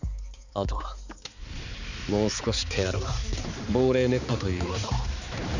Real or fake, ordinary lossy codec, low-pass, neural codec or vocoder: fake; none; 7.2 kHz; codec, 16 kHz, 4 kbps, X-Codec, HuBERT features, trained on balanced general audio